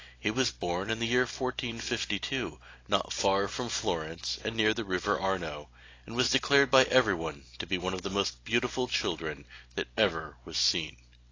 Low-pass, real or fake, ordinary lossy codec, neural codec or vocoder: 7.2 kHz; real; AAC, 32 kbps; none